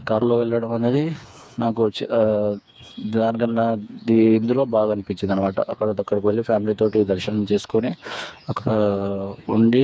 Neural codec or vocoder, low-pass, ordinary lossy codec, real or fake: codec, 16 kHz, 4 kbps, FreqCodec, smaller model; none; none; fake